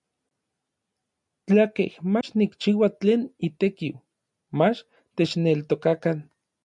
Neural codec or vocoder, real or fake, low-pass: none; real; 10.8 kHz